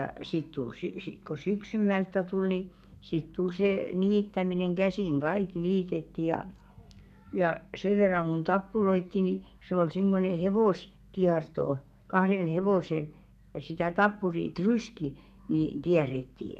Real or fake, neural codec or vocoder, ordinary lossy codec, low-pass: fake; codec, 32 kHz, 1.9 kbps, SNAC; none; 14.4 kHz